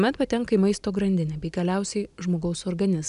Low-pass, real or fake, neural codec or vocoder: 10.8 kHz; real; none